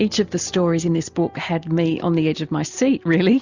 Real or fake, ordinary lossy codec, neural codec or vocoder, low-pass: real; Opus, 64 kbps; none; 7.2 kHz